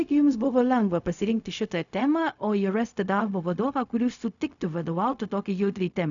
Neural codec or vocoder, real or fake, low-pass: codec, 16 kHz, 0.4 kbps, LongCat-Audio-Codec; fake; 7.2 kHz